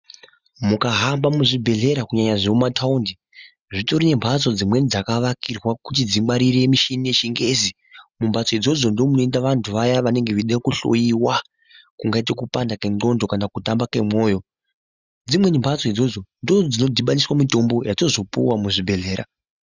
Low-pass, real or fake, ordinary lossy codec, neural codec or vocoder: 7.2 kHz; real; Opus, 64 kbps; none